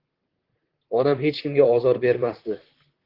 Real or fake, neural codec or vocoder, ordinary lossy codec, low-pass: fake; vocoder, 44.1 kHz, 128 mel bands, Pupu-Vocoder; Opus, 16 kbps; 5.4 kHz